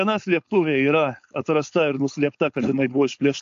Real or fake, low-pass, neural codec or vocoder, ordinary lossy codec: fake; 7.2 kHz; codec, 16 kHz, 4.8 kbps, FACodec; AAC, 64 kbps